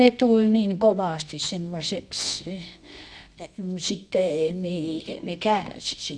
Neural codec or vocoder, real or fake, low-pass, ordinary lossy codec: codec, 24 kHz, 0.9 kbps, WavTokenizer, medium music audio release; fake; 9.9 kHz; none